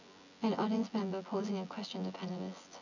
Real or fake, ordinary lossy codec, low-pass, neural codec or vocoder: fake; none; 7.2 kHz; vocoder, 24 kHz, 100 mel bands, Vocos